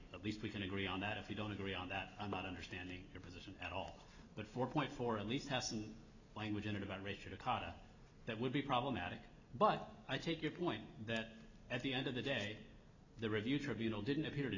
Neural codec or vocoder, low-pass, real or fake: none; 7.2 kHz; real